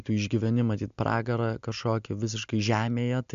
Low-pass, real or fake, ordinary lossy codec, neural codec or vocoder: 7.2 kHz; real; MP3, 64 kbps; none